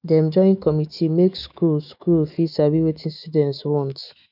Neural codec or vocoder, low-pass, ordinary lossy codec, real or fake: autoencoder, 48 kHz, 128 numbers a frame, DAC-VAE, trained on Japanese speech; 5.4 kHz; none; fake